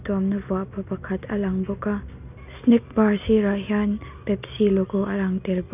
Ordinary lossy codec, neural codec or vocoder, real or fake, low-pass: none; none; real; 3.6 kHz